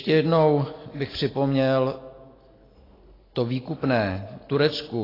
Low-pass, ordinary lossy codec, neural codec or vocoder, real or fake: 5.4 kHz; AAC, 24 kbps; none; real